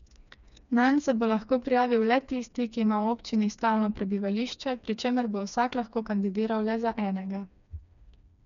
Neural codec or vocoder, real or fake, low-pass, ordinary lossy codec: codec, 16 kHz, 2 kbps, FreqCodec, smaller model; fake; 7.2 kHz; none